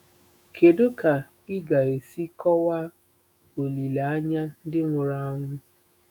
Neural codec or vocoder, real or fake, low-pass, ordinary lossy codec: autoencoder, 48 kHz, 128 numbers a frame, DAC-VAE, trained on Japanese speech; fake; none; none